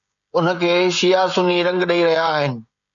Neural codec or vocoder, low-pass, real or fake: codec, 16 kHz, 16 kbps, FreqCodec, smaller model; 7.2 kHz; fake